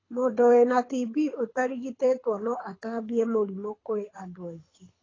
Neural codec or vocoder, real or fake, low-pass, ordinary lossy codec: codec, 24 kHz, 6 kbps, HILCodec; fake; 7.2 kHz; AAC, 32 kbps